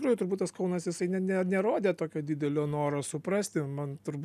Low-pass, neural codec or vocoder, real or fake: 14.4 kHz; none; real